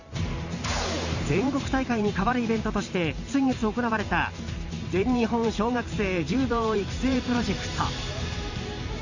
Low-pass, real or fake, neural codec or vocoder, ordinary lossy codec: 7.2 kHz; fake; vocoder, 44.1 kHz, 128 mel bands every 512 samples, BigVGAN v2; Opus, 64 kbps